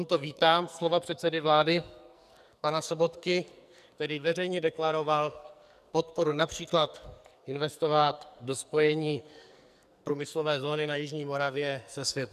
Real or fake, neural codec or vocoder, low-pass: fake; codec, 44.1 kHz, 2.6 kbps, SNAC; 14.4 kHz